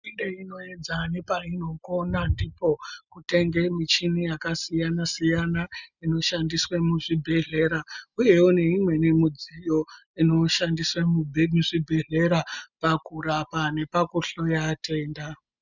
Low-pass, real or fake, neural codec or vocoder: 7.2 kHz; real; none